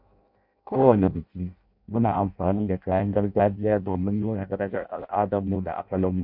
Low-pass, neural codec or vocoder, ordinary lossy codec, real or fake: 5.4 kHz; codec, 16 kHz in and 24 kHz out, 0.6 kbps, FireRedTTS-2 codec; MP3, 48 kbps; fake